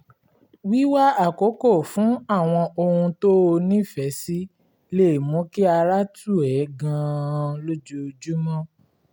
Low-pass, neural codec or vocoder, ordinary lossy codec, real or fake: 19.8 kHz; none; none; real